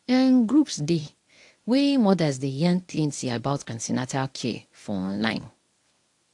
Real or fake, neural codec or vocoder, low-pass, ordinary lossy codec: fake; codec, 24 kHz, 0.9 kbps, WavTokenizer, medium speech release version 1; 10.8 kHz; AAC, 64 kbps